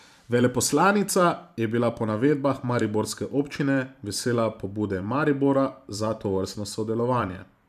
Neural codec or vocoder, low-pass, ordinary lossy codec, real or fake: vocoder, 44.1 kHz, 128 mel bands every 512 samples, BigVGAN v2; 14.4 kHz; none; fake